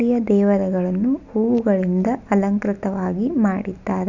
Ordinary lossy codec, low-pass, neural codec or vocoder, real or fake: none; 7.2 kHz; none; real